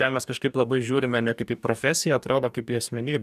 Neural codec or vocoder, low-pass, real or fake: codec, 44.1 kHz, 2.6 kbps, DAC; 14.4 kHz; fake